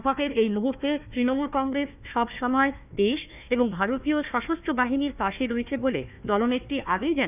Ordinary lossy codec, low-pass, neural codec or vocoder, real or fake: none; 3.6 kHz; codec, 16 kHz, 1 kbps, FunCodec, trained on Chinese and English, 50 frames a second; fake